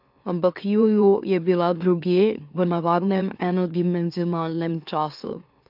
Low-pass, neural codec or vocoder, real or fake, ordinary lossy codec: 5.4 kHz; autoencoder, 44.1 kHz, a latent of 192 numbers a frame, MeloTTS; fake; AAC, 48 kbps